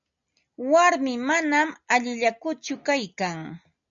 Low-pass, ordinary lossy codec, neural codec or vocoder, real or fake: 7.2 kHz; MP3, 64 kbps; none; real